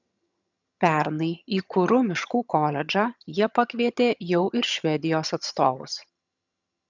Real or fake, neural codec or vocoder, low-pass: fake; vocoder, 22.05 kHz, 80 mel bands, HiFi-GAN; 7.2 kHz